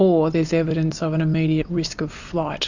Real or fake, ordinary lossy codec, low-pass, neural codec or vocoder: real; Opus, 64 kbps; 7.2 kHz; none